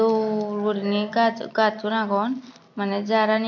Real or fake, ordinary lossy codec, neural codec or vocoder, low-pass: real; none; none; 7.2 kHz